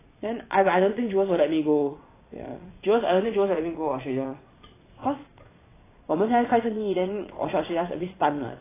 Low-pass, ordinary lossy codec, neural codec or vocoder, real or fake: 3.6 kHz; AAC, 16 kbps; vocoder, 22.05 kHz, 80 mel bands, Vocos; fake